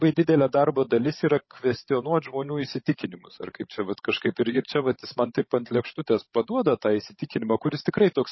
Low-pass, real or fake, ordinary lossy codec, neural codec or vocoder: 7.2 kHz; fake; MP3, 24 kbps; codec, 16 kHz, 16 kbps, FreqCodec, larger model